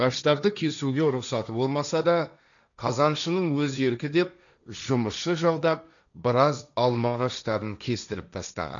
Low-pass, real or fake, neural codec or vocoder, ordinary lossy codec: 7.2 kHz; fake; codec, 16 kHz, 1.1 kbps, Voila-Tokenizer; none